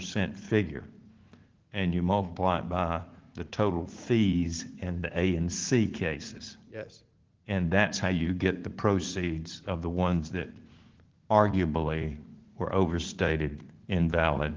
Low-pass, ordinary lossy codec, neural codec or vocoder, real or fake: 7.2 kHz; Opus, 16 kbps; vocoder, 44.1 kHz, 80 mel bands, Vocos; fake